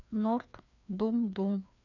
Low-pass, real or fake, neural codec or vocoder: 7.2 kHz; fake; codec, 16 kHz, 2 kbps, FreqCodec, larger model